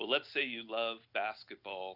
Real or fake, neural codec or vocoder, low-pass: real; none; 5.4 kHz